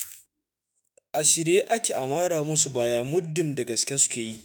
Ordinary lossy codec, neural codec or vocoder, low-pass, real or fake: none; autoencoder, 48 kHz, 32 numbers a frame, DAC-VAE, trained on Japanese speech; none; fake